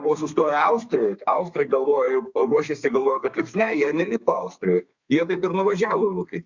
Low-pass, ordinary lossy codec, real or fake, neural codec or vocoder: 7.2 kHz; Opus, 64 kbps; fake; codec, 32 kHz, 1.9 kbps, SNAC